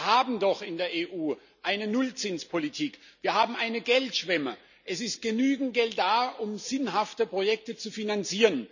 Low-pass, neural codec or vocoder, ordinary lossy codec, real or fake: 7.2 kHz; none; none; real